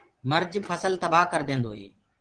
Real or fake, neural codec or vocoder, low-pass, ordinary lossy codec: fake; vocoder, 22.05 kHz, 80 mel bands, Vocos; 9.9 kHz; Opus, 16 kbps